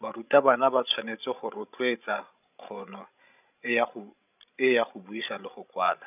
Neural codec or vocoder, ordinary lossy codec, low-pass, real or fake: codec, 16 kHz, 16 kbps, FreqCodec, larger model; none; 3.6 kHz; fake